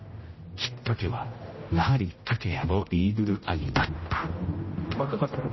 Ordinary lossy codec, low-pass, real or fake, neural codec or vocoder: MP3, 24 kbps; 7.2 kHz; fake; codec, 16 kHz, 0.5 kbps, X-Codec, HuBERT features, trained on general audio